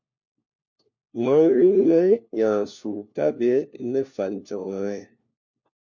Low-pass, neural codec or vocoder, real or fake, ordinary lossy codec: 7.2 kHz; codec, 16 kHz, 1 kbps, FunCodec, trained on LibriTTS, 50 frames a second; fake; MP3, 48 kbps